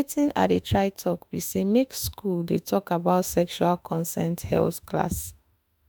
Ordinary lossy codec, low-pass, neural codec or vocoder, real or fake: none; none; autoencoder, 48 kHz, 32 numbers a frame, DAC-VAE, trained on Japanese speech; fake